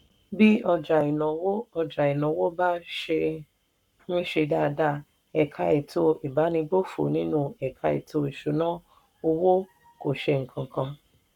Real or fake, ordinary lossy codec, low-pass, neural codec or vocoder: fake; none; 19.8 kHz; codec, 44.1 kHz, 7.8 kbps, Pupu-Codec